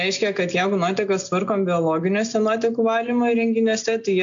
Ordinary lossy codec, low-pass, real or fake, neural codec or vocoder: AAC, 64 kbps; 7.2 kHz; real; none